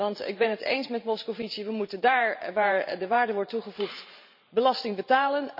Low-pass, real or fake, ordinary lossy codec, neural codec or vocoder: 5.4 kHz; fake; none; vocoder, 44.1 kHz, 128 mel bands every 512 samples, BigVGAN v2